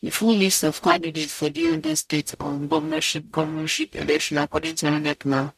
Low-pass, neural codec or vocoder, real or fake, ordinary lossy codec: 14.4 kHz; codec, 44.1 kHz, 0.9 kbps, DAC; fake; none